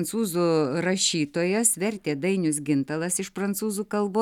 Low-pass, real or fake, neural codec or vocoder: 19.8 kHz; real; none